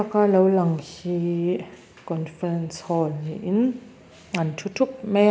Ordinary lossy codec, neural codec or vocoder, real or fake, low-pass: none; none; real; none